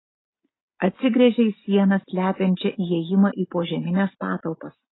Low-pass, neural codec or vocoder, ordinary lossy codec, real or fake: 7.2 kHz; none; AAC, 16 kbps; real